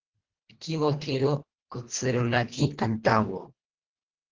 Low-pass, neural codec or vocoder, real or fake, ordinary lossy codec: 7.2 kHz; codec, 24 kHz, 1.5 kbps, HILCodec; fake; Opus, 16 kbps